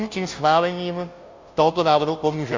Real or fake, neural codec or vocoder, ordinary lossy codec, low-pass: fake; codec, 16 kHz, 0.5 kbps, FunCodec, trained on Chinese and English, 25 frames a second; MP3, 48 kbps; 7.2 kHz